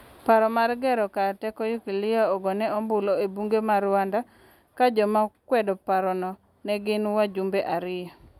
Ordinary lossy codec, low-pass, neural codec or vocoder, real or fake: none; 19.8 kHz; none; real